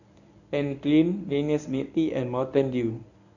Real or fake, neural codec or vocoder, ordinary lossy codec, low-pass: fake; codec, 24 kHz, 0.9 kbps, WavTokenizer, medium speech release version 1; none; 7.2 kHz